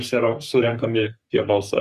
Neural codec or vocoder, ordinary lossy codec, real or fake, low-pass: codec, 44.1 kHz, 3.4 kbps, Pupu-Codec; Opus, 64 kbps; fake; 14.4 kHz